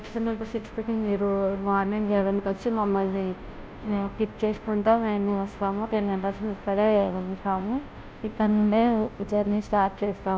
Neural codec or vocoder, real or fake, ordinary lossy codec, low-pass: codec, 16 kHz, 0.5 kbps, FunCodec, trained on Chinese and English, 25 frames a second; fake; none; none